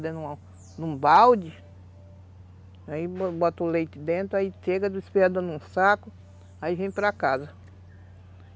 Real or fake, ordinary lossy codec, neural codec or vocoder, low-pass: real; none; none; none